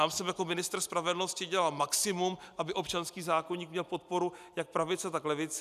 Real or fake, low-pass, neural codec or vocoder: real; 14.4 kHz; none